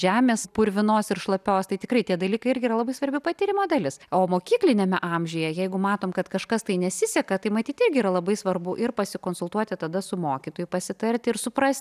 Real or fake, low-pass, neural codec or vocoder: real; 14.4 kHz; none